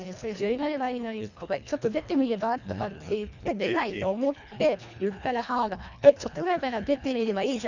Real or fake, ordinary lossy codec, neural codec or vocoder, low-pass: fake; none; codec, 24 kHz, 1.5 kbps, HILCodec; 7.2 kHz